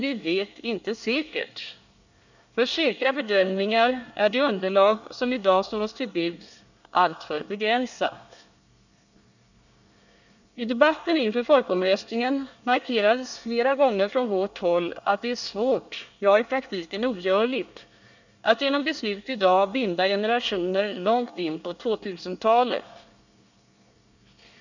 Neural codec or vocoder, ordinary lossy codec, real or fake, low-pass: codec, 24 kHz, 1 kbps, SNAC; none; fake; 7.2 kHz